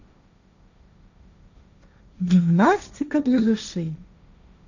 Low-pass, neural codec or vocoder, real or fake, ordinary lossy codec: 7.2 kHz; codec, 16 kHz, 1.1 kbps, Voila-Tokenizer; fake; none